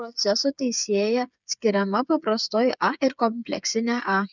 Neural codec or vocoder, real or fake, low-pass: codec, 16 kHz, 8 kbps, FreqCodec, smaller model; fake; 7.2 kHz